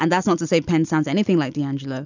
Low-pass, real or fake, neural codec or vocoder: 7.2 kHz; real; none